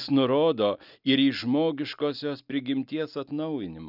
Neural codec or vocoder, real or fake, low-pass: none; real; 5.4 kHz